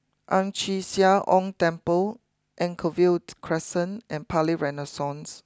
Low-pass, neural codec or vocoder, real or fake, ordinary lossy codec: none; none; real; none